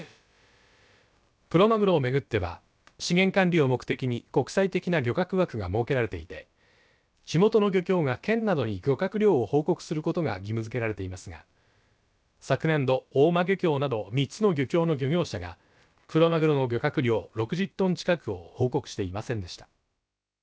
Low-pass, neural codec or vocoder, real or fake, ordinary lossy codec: none; codec, 16 kHz, about 1 kbps, DyCAST, with the encoder's durations; fake; none